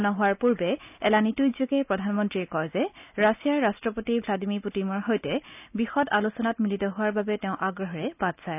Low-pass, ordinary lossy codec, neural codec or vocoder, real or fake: 3.6 kHz; none; none; real